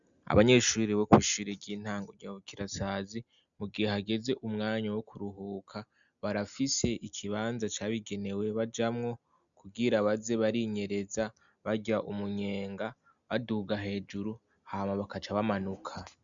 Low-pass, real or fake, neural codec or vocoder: 7.2 kHz; real; none